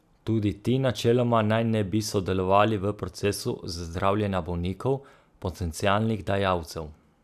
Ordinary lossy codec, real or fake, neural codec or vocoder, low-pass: none; real; none; 14.4 kHz